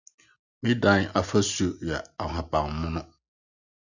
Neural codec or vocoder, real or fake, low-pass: none; real; 7.2 kHz